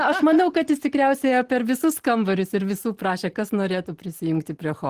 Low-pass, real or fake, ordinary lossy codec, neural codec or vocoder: 14.4 kHz; real; Opus, 16 kbps; none